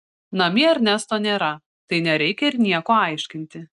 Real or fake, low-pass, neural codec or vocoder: real; 10.8 kHz; none